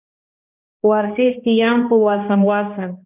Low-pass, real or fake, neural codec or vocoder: 3.6 kHz; fake; codec, 16 kHz, 1 kbps, X-Codec, HuBERT features, trained on balanced general audio